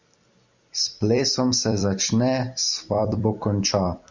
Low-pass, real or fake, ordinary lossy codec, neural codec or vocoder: 7.2 kHz; fake; MP3, 64 kbps; vocoder, 44.1 kHz, 128 mel bands every 256 samples, BigVGAN v2